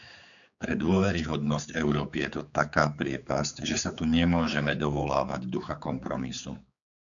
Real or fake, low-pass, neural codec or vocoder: fake; 7.2 kHz; codec, 16 kHz, 4 kbps, X-Codec, HuBERT features, trained on general audio